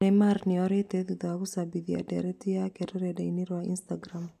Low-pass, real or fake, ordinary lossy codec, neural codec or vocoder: 14.4 kHz; real; none; none